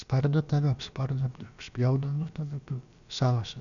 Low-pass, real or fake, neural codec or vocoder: 7.2 kHz; fake; codec, 16 kHz, about 1 kbps, DyCAST, with the encoder's durations